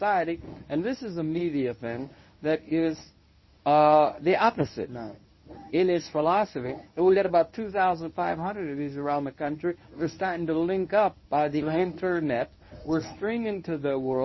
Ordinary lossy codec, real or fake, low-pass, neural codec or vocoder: MP3, 24 kbps; fake; 7.2 kHz; codec, 24 kHz, 0.9 kbps, WavTokenizer, medium speech release version 1